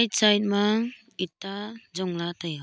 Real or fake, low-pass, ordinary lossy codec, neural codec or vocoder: real; none; none; none